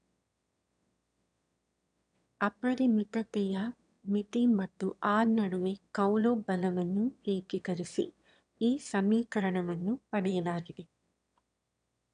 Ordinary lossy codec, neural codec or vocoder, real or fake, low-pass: none; autoencoder, 22.05 kHz, a latent of 192 numbers a frame, VITS, trained on one speaker; fake; 9.9 kHz